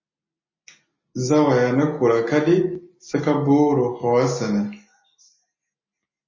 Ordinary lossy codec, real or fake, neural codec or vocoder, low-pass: MP3, 32 kbps; real; none; 7.2 kHz